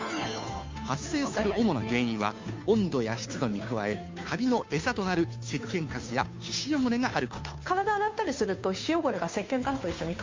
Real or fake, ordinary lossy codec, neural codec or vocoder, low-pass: fake; MP3, 48 kbps; codec, 16 kHz, 2 kbps, FunCodec, trained on Chinese and English, 25 frames a second; 7.2 kHz